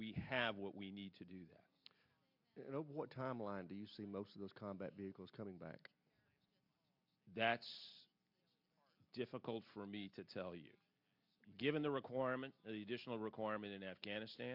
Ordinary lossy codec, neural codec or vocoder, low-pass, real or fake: MP3, 48 kbps; none; 5.4 kHz; real